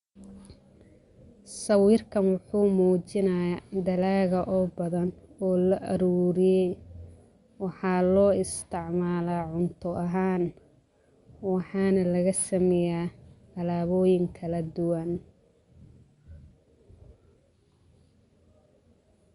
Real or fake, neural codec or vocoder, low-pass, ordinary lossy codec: real; none; 10.8 kHz; Opus, 64 kbps